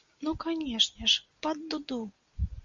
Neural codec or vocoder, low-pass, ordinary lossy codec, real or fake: none; 7.2 kHz; Opus, 64 kbps; real